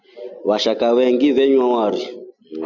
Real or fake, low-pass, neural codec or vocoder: real; 7.2 kHz; none